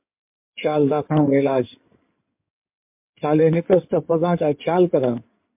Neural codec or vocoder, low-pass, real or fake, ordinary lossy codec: codec, 16 kHz in and 24 kHz out, 2.2 kbps, FireRedTTS-2 codec; 3.6 kHz; fake; MP3, 32 kbps